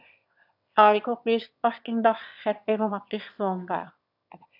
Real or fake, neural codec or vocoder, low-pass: fake; autoencoder, 22.05 kHz, a latent of 192 numbers a frame, VITS, trained on one speaker; 5.4 kHz